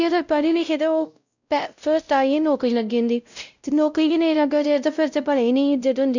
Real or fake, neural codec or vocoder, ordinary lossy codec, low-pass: fake; codec, 16 kHz, 0.5 kbps, X-Codec, WavLM features, trained on Multilingual LibriSpeech; none; 7.2 kHz